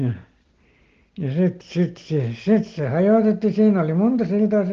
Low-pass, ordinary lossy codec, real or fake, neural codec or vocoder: 7.2 kHz; Opus, 16 kbps; real; none